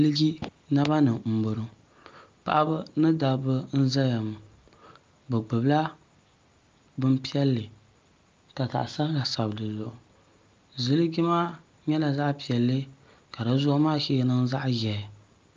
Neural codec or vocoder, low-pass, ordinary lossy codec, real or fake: none; 7.2 kHz; Opus, 24 kbps; real